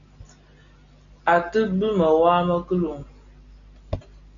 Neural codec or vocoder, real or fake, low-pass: none; real; 7.2 kHz